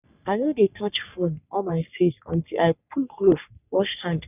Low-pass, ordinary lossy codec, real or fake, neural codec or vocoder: 3.6 kHz; none; fake; codec, 44.1 kHz, 3.4 kbps, Pupu-Codec